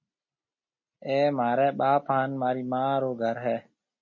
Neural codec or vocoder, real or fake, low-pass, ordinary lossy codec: none; real; 7.2 kHz; MP3, 32 kbps